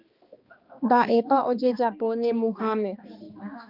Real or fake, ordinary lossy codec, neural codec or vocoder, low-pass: fake; Opus, 24 kbps; codec, 16 kHz, 2 kbps, X-Codec, HuBERT features, trained on balanced general audio; 5.4 kHz